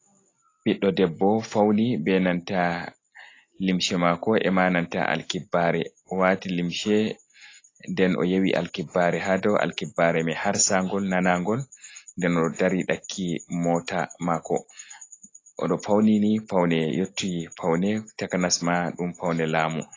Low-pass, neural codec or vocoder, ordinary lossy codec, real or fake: 7.2 kHz; none; AAC, 32 kbps; real